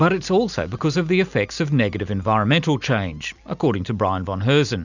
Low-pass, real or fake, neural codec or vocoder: 7.2 kHz; real; none